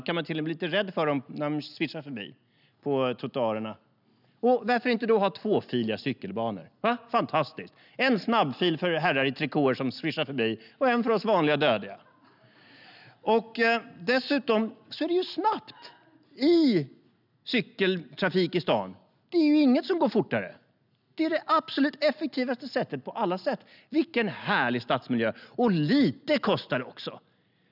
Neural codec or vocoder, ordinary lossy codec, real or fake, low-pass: none; none; real; 5.4 kHz